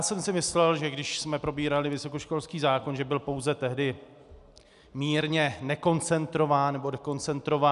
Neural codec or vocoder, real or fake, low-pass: none; real; 10.8 kHz